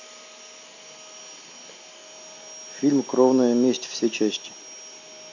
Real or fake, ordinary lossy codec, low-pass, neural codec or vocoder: real; AAC, 48 kbps; 7.2 kHz; none